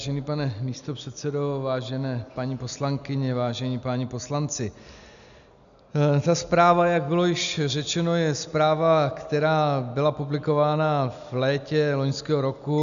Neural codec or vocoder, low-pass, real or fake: none; 7.2 kHz; real